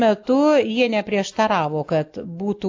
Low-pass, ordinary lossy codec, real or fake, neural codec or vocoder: 7.2 kHz; AAC, 48 kbps; real; none